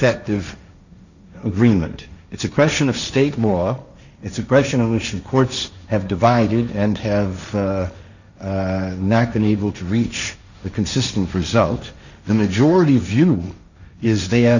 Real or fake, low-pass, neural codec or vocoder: fake; 7.2 kHz; codec, 16 kHz, 1.1 kbps, Voila-Tokenizer